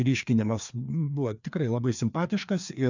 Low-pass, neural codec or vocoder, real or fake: 7.2 kHz; codec, 16 kHz, 2 kbps, FreqCodec, larger model; fake